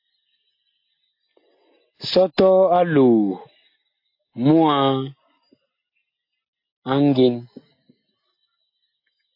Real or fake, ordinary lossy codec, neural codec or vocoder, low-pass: real; AAC, 32 kbps; none; 5.4 kHz